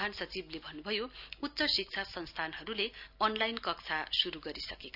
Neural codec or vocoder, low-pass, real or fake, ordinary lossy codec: none; 5.4 kHz; real; none